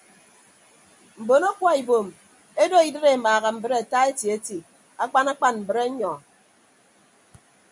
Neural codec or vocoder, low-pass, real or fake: none; 10.8 kHz; real